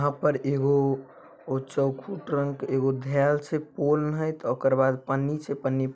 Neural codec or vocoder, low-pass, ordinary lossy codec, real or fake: none; none; none; real